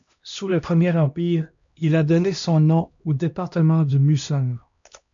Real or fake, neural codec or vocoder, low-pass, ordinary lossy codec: fake; codec, 16 kHz, 1 kbps, X-Codec, HuBERT features, trained on LibriSpeech; 7.2 kHz; MP3, 64 kbps